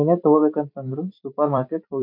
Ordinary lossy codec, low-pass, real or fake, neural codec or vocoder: MP3, 48 kbps; 5.4 kHz; fake; vocoder, 44.1 kHz, 128 mel bands every 256 samples, BigVGAN v2